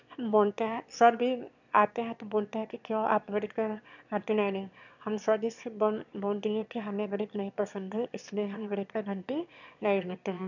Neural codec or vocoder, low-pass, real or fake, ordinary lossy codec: autoencoder, 22.05 kHz, a latent of 192 numbers a frame, VITS, trained on one speaker; 7.2 kHz; fake; none